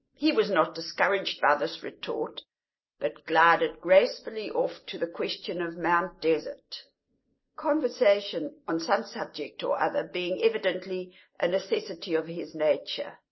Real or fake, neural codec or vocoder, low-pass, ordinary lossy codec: real; none; 7.2 kHz; MP3, 24 kbps